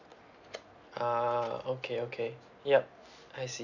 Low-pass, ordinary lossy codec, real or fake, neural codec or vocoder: 7.2 kHz; none; real; none